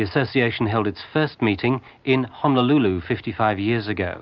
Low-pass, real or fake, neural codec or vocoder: 7.2 kHz; real; none